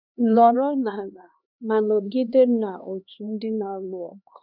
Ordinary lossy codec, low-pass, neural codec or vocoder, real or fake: none; 5.4 kHz; codec, 16 kHz, 2 kbps, X-Codec, HuBERT features, trained on LibriSpeech; fake